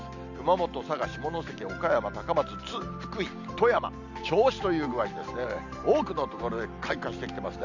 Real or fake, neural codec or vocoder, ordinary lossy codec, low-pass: real; none; none; 7.2 kHz